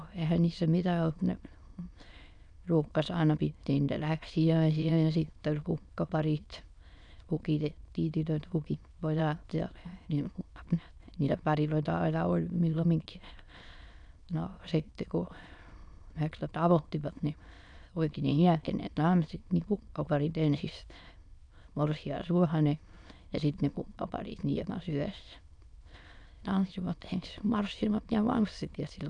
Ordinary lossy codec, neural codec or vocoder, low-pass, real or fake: none; autoencoder, 22.05 kHz, a latent of 192 numbers a frame, VITS, trained on many speakers; 9.9 kHz; fake